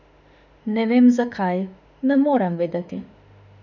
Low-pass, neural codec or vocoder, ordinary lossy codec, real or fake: 7.2 kHz; autoencoder, 48 kHz, 32 numbers a frame, DAC-VAE, trained on Japanese speech; none; fake